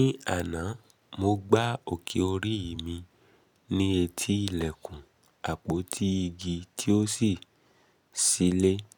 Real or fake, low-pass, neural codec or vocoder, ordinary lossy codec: real; none; none; none